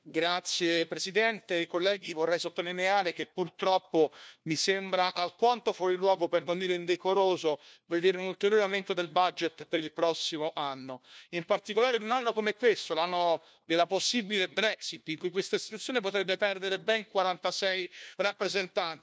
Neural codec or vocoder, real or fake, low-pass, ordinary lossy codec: codec, 16 kHz, 1 kbps, FunCodec, trained on LibriTTS, 50 frames a second; fake; none; none